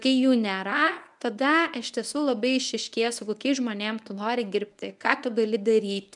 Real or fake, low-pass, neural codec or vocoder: fake; 10.8 kHz; codec, 24 kHz, 0.9 kbps, WavTokenizer, medium speech release version 1